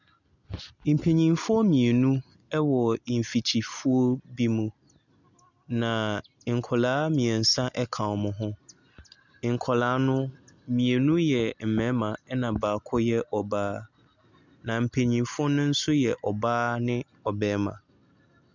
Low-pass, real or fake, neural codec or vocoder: 7.2 kHz; real; none